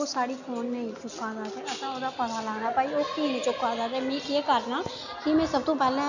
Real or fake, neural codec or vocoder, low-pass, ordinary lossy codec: real; none; 7.2 kHz; none